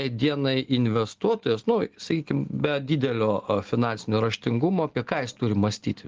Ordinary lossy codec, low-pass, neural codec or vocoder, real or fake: Opus, 16 kbps; 7.2 kHz; none; real